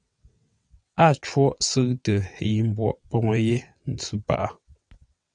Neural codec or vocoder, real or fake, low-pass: vocoder, 22.05 kHz, 80 mel bands, WaveNeXt; fake; 9.9 kHz